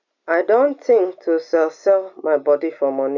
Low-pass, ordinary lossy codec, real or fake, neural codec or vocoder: 7.2 kHz; none; real; none